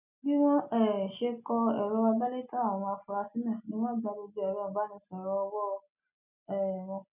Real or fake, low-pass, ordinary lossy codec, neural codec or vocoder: real; 3.6 kHz; none; none